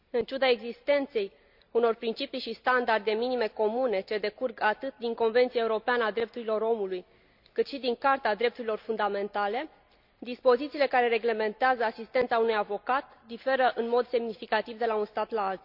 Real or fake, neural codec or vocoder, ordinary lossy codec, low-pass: real; none; none; 5.4 kHz